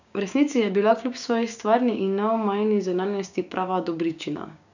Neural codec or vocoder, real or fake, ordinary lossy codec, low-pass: codec, 16 kHz, 6 kbps, DAC; fake; none; 7.2 kHz